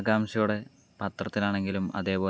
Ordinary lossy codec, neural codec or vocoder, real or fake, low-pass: none; none; real; none